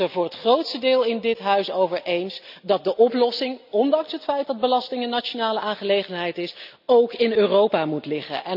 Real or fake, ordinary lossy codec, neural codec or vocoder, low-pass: real; none; none; 5.4 kHz